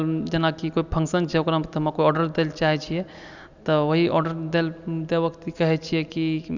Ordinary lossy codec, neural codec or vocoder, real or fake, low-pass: none; none; real; 7.2 kHz